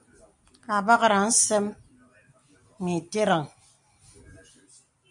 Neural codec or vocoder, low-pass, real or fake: none; 10.8 kHz; real